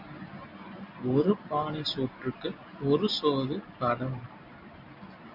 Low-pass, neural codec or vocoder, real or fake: 5.4 kHz; none; real